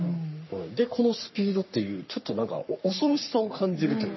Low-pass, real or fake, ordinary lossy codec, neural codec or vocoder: 7.2 kHz; fake; MP3, 24 kbps; codec, 44.1 kHz, 3.4 kbps, Pupu-Codec